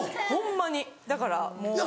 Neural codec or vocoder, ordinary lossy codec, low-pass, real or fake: none; none; none; real